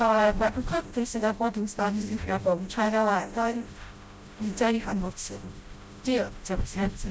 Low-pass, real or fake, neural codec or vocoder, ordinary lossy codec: none; fake; codec, 16 kHz, 0.5 kbps, FreqCodec, smaller model; none